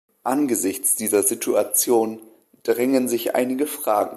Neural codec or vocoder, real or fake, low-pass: none; real; 14.4 kHz